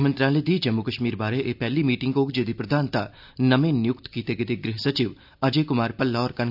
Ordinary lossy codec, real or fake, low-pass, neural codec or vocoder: none; real; 5.4 kHz; none